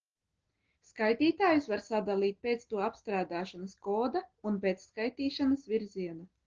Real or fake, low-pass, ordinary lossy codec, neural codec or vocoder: real; 7.2 kHz; Opus, 16 kbps; none